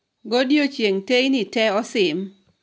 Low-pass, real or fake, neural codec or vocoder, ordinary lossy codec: none; real; none; none